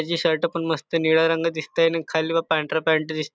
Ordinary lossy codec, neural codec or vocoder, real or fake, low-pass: none; none; real; none